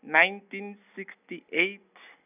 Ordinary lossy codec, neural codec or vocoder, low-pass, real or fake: none; none; 3.6 kHz; real